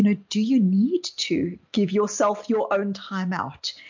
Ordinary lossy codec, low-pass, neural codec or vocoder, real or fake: MP3, 48 kbps; 7.2 kHz; none; real